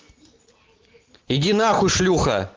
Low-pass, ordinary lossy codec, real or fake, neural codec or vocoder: 7.2 kHz; Opus, 16 kbps; real; none